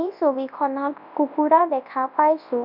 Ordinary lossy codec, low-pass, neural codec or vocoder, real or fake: AAC, 48 kbps; 5.4 kHz; codec, 24 kHz, 0.9 kbps, WavTokenizer, large speech release; fake